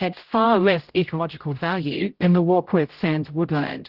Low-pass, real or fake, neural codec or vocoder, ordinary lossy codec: 5.4 kHz; fake; codec, 16 kHz, 0.5 kbps, X-Codec, HuBERT features, trained on general audio; Opus, 16 kbps